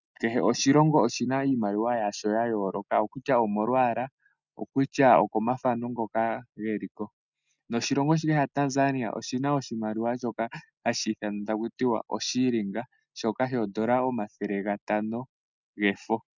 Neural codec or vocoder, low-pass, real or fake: none; 7.2 kHz; real